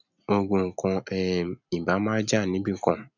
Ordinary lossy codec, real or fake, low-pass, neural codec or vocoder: none; real; 7.2 kHz; none